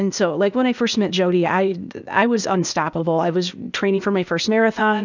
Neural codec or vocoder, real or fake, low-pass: codec, 16 kHz, 0.8 kbps, ZipCodec; fake; 7.2 kHz